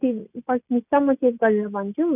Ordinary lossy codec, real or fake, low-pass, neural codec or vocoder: none; real; 3.6 kHz; none